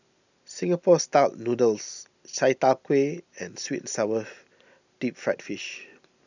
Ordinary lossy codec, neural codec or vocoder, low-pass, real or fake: none; none; 7.2 kHz; real